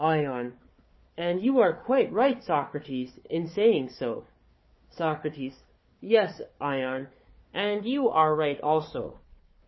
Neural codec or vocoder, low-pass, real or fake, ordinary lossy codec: codec, 16 kHz, 4 kbps, FunCodec, trained on Chinese and English, 50 frames a second; 7.2 kHz; fake; MP3, 24 kbps